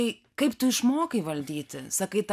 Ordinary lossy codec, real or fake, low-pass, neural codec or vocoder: AAC, 96 kbps; real; 14.4 kHz; none